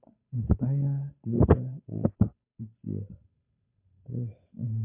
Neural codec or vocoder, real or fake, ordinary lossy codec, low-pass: codec, 16 kHz, 8 kbps, FunCodec, trained on Chinese and English, 25 frames a second; fake; MP3, 32 kbps; 3.6 kHz